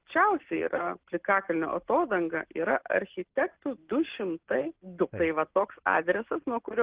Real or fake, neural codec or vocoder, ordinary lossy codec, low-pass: real; none; Opus, 16 kbps; 3.6 kHz